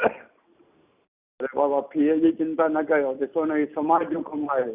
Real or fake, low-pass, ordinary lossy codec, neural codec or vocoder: real; 3.6 kHz; Opus, 64 kbps; none